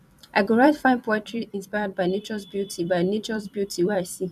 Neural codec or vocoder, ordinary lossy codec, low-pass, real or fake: vocoder, 44.1 kHz, 128 mel bands every 256 samples, BigVGAN v2; none; 14.4 kHz; fake